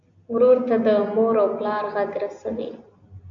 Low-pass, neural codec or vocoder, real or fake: 7.2 kHz; none; real